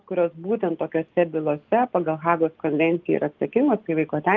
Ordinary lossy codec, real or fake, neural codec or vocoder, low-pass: Opus, 32 kbps; real; none; 7.2 kHz